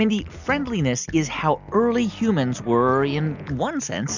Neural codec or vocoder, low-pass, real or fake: none; 7.2 kHz; real